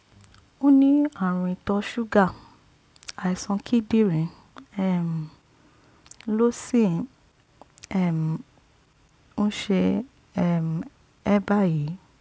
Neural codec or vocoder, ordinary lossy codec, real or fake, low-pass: none; none; real; none